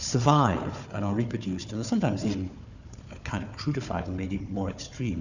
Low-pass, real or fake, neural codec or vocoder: 7.2 kHz; fake; codec, 16 kHz, 4 kbps, FunCodec, trained on Chinese and English, 50 frames a second